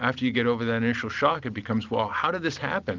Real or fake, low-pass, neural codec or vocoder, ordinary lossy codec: real; 7.2 kHz; none; Opus, 32 kbps